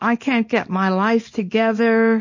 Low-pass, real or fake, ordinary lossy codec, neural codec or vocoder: 7.2 kHz; fake; MP3, 32 kbps; codec, 24 kHz, 0.9 kbps, WavTokenizer, small release